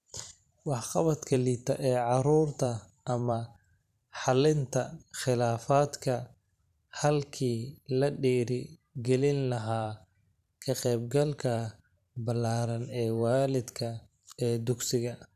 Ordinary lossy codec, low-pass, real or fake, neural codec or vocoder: none; 14.4 kHz; fake; vocoder, 44.1 kHz, 128 mel bands every 512 samples, BigVGAN v2